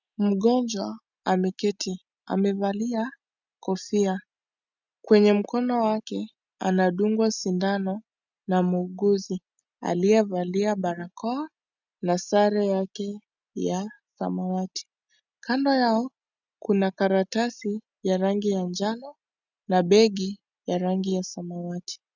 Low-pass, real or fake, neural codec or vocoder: 7.2 kHz; real; none